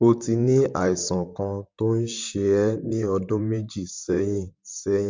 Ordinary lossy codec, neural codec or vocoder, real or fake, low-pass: MP3, 64 kbps; vocoder, 44.1 kHz, 128 mel bands, Pupu-Vocoder; fake; 7.2 kHz